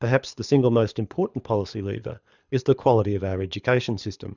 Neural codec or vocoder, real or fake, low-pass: codec, 24 kHz, 6 kbps, HILCodec; fake; 7.2 kHz